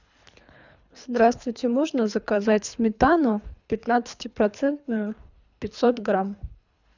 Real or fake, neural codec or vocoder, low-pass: fake; codec, 24 kHz, 3 kbps, HILCodec; 7.2 kHz